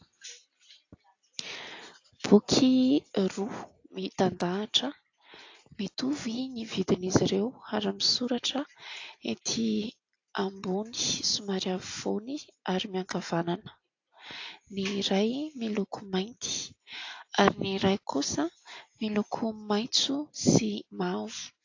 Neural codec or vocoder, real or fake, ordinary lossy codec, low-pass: none; real; AAC, 48 kbps; 7.2 kHz